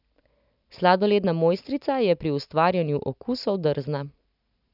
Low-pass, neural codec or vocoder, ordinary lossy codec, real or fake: 5.4 kHz; none; none; real